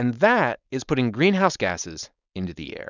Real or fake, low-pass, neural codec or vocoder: fake; 7.2 kHz; codec, 16 kHz, 4.8 kbps, FACodec